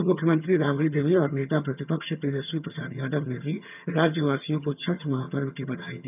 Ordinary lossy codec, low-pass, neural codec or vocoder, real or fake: none; 3.6 kHz; vocoder, 22.05 kHz, 80 mel bands, HiFi-GAN; fake